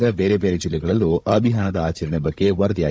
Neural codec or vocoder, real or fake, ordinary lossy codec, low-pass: codec, 16 kHz, 16 kbps, FunCodec, trained on Chinese and English, 50 frames a second; fake; none; none